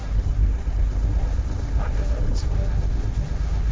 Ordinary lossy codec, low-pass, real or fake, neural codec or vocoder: none; none; fake; codec, 16 kHz, 1.1 kbps, Voila-Tokenizer